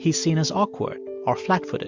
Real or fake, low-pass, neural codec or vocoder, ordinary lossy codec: real; 7.2 kHz; none; MP3, 64 kbps